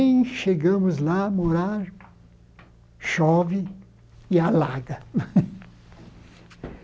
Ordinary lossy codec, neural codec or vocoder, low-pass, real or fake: none; none; none; real